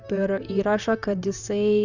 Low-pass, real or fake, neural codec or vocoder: 7.2 kHz; fake; vocoder, 44.1 kHz, 128 mel bands, Pupu-Vocoder